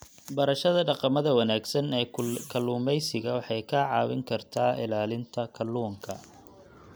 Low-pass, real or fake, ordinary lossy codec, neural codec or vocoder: none; real; none; none